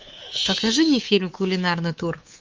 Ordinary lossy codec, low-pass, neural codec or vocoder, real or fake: Opus, 24 kbps; 7.2 kHz; codec, 24 kHz, 3.1 kbps, DualCodec; fake